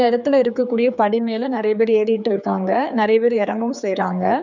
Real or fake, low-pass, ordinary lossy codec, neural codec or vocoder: fake; 7.2 kHz; none; codec, 16 kHz, 4 kbps, X-Codec, HuBERT features, trained on general audio